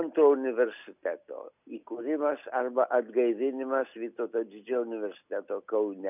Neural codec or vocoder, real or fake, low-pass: none; real; 3.6 kHz